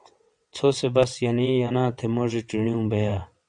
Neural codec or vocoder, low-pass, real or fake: vocoder, 22.05 kHz, 80 mel bands, WaveNeXt; 9.9 kHz; fake